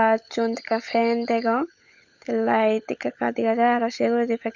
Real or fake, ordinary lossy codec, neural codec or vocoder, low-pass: fake; none; codec, 16 kHz, 16 kbps, FunCodec, trained on LibriTTS, 50 frames a second; 7.2 kHz